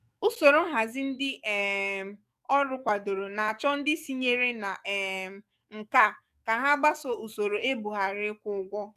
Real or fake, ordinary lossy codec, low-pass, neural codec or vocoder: fake; none; 14.4 kHz; codec, 44.1 kHz, 7.8 kbps, DAC